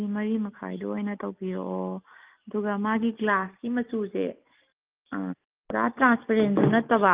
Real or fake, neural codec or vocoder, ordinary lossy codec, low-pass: real; none; Opus, 24 kbps; 3.6 kHz